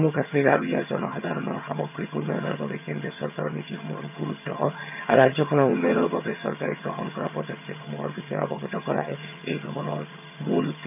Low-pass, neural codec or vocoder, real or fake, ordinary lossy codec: 3.6 kHz; vocoder, 22.05 kHz, 80 mel bands, HiFi-GAN; fake; AAC, 32 kbps